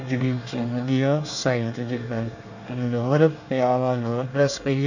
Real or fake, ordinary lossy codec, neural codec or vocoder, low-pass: fake; none; codec, 24 kHz, 1 kbps, SNAC; 7.2 kHz